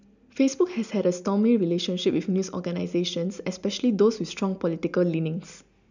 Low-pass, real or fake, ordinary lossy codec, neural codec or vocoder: 7.2 kHz; real; none; none